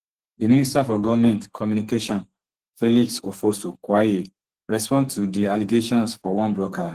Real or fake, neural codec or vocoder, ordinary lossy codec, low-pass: fake; codec, 32 kHz, 1.9 kbps, SNAC; Opus, 16 kbps; 14.4 kHz